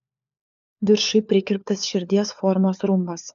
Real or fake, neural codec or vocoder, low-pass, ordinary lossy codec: fake; codec, 16 kHz, 4 kbps, FunCodec, trained on LibriTTS, 50 frames a second; 7.2 kHz; AAC, 64 kbps